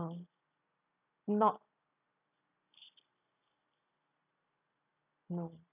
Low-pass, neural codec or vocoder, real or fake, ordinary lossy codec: 3.6 kHz; none; real; none